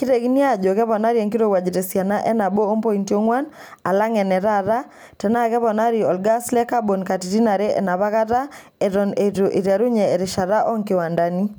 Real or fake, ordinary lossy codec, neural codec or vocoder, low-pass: real; none; none; none